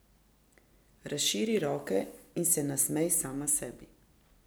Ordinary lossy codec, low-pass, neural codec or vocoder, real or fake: none; none; none; real